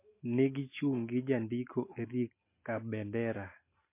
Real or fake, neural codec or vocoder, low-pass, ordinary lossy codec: real; none; 3.6 kHz; MP3, 24 kbps